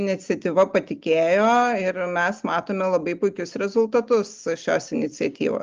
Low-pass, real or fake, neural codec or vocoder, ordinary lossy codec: 7.2 kHz; real; none; Opus, 32 kbps